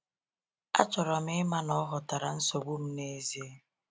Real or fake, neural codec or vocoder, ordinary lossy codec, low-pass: real; none; none; none